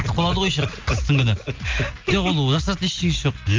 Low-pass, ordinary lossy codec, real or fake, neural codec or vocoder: 7.2 kHz; Opus, 32 kbps; real; none